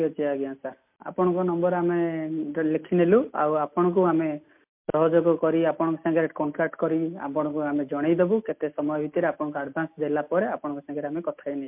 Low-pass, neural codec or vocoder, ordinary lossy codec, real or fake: 3.6 kHz; none; MP3, 32 kbps; real